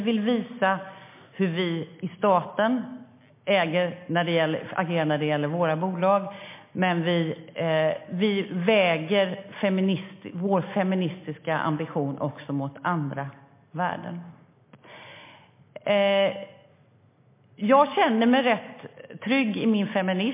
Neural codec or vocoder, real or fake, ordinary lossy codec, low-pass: none; real; MP3, 24 kbps; 3.6 kHz